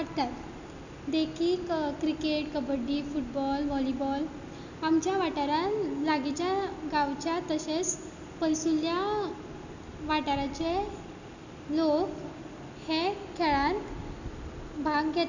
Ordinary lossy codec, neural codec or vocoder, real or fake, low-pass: none; none; real; 7.2 kHz